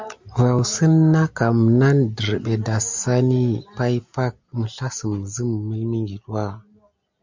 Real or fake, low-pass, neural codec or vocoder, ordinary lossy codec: real; 7.2 kHz; none; MP3, 64 kbps